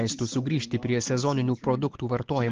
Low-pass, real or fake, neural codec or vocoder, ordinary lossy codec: 7.2 kHz; real; none; Opus, 16 kbps